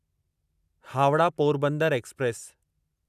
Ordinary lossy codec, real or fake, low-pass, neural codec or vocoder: none; real; 14.4 kHz; none